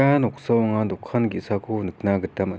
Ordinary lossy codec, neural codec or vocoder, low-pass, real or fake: none; none; none; real